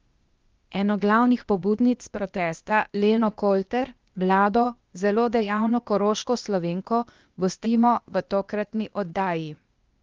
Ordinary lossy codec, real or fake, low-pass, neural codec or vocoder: Opus, 32 kbps; fake; 7.2 kHz; codec, 16 kHz, 0.8 kbps, ZipCodec